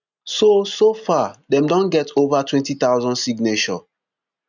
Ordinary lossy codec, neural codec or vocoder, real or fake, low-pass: none; none; real; 7.2 kHz